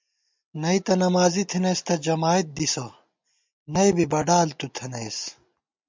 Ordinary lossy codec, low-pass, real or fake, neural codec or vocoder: MP3, 64 kbps; 7.2 kHz; real; none